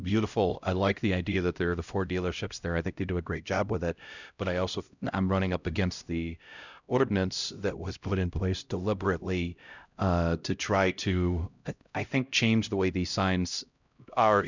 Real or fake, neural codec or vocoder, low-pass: fake; codec, 16 kHz, 0.5 kbps, X-Codec, HuBERT features, trained on LibriSpeech; 7.2 kHz